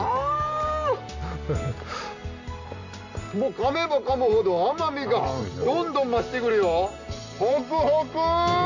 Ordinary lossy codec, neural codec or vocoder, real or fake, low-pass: none; none; real; 7.2 kHz